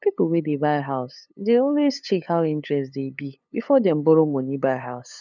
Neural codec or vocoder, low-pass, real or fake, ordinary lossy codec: codec, 16 kHz, 8 kbps, FunCodec, trained on LibriTTS, 25 frames a second; 7.2 kHz; fake; none